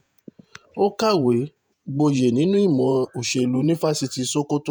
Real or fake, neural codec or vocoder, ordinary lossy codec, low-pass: fake; vocoder, 48 kHz, 128 mel bands, Vocos; none; none